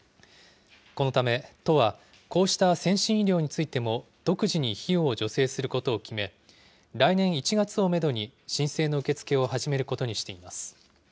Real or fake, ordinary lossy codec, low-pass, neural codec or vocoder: real; none; none; none